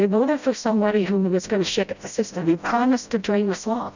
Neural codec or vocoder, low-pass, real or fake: codec, 16 kHz, 0.5 kbps, FreqCodec, smaller model; 7.2 kHz; fake